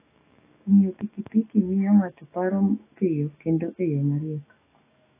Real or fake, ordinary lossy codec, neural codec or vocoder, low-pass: fake; AAC, 16 kbps; autoencoder, 48 kHz, 128 numbers a frame, DAC-VAE, trained on Japanese speech; 3.6 kHz